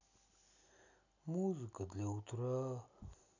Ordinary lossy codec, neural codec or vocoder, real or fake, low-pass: none; none; real; 7.2 kHz